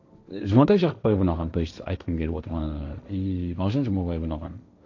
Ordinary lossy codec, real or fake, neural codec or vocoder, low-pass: none; fake; codec, 16 kHz, 1.1 kbps, Voila-Tokenizer; 7.2 kHz